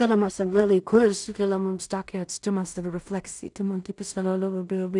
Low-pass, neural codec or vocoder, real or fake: 10.8 kHz; codec, 16 kHz in and 24 kHz out, 0.4 kbps, LongCat-Audio-Codec, two codebook decoder; fake